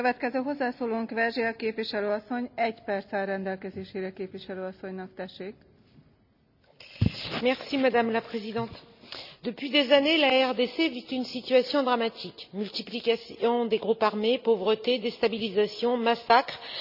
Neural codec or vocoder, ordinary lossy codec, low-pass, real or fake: none; none; 5.4 kHz; real